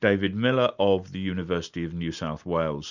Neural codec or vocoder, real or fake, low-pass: none; real; 7.2 kHz